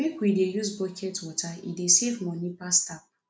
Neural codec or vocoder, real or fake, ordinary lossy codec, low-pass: none; real; none; none